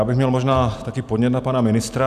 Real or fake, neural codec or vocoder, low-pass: real; none; 14.4 kHz